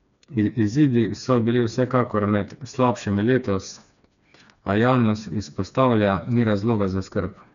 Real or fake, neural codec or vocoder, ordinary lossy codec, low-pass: fake; codec, 16 kHz, 2 kbps, FreqCodec, smaller model; none; 7.2 kHz